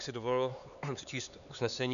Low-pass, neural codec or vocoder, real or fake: 7.2 kHz; codec, 16 kHz, 4 kbps, X-Codec, HuBERT features, trained on LibriSpeech; fake